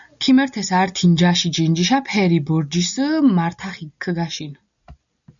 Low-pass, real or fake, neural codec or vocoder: 7.2 kHz; real; none